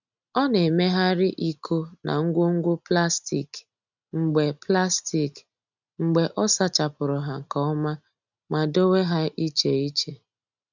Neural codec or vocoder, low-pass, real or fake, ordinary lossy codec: none; 7.2 kHz; real; none